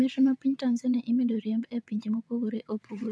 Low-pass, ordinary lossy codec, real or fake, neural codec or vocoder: none; none; fake; vocoder, 22.05 kHz, 80 mel bands, WaveNeXt